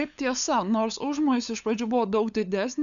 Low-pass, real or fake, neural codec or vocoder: 7.2 kHz; fake; codec, 16 kHz, 8 kbps, FunCodec, trained on LibriTTS, 25 frames a second